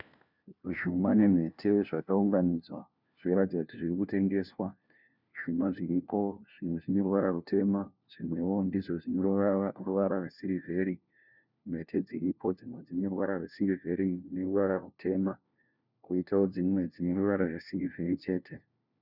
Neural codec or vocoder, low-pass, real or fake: codec, 16 kHz, 1 kbps, FunCodec, trained on LibriTTS, 50 frames a second; 5.4 kHz; fake